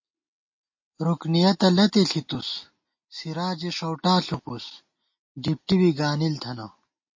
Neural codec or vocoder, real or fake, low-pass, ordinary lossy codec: none; real; 7.2 kHz; MP3, 32 kbps